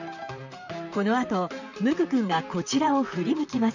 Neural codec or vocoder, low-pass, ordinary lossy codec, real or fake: vocoder, 44.1 kHz, 128 mel bands, Pupu-Vocoder; 7.2 kHz; none; fake